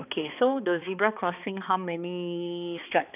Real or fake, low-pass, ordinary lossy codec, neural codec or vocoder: fake; 3.6 kHz; none; codec, 16 kHz, 2 kbps, X-Codec, HuBERT features, trained on balanced general audio